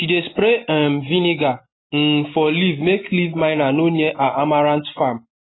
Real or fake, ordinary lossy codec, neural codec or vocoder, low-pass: real; AAC, 16 kbps; none; 7.2 kHz